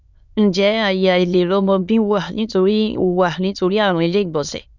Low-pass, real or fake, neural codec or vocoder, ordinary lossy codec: 7.2 kHz; fake; autoencoder, 22.05 kHz, a latent of 192 numbers a frame, VITS, trained on many speakers; none